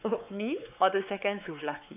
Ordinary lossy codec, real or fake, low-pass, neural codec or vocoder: none; fake; 3.6 kHz; codec, 16 kHz, 4 kbps, X-Codec, WavLM features, trained on Multilingual LibriSpeech